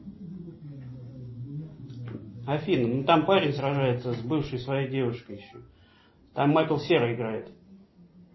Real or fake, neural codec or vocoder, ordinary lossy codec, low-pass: real; none; MP3, 24 kbps; 7.2 kHz